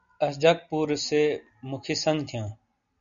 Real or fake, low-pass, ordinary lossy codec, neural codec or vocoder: real; 7.2 kHz; MP3, 96 kbps; none